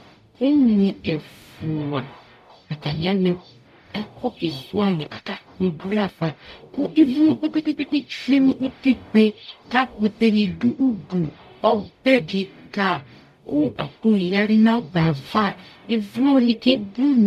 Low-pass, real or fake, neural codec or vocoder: 14.4 kHz; fake; codec, 44.1 kHz, 0.9 kbps, DAC